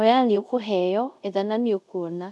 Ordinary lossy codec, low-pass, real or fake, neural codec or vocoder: none; none; fake; codec, 24 kHz, 0.5 kbps, DualCodec